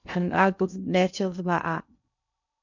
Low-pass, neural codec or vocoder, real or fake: 7.2 kHz; codec, 16 kHz in and 24 kHz out, 0.6 kbps, FocalCodec, streaming, 2048 codes; fake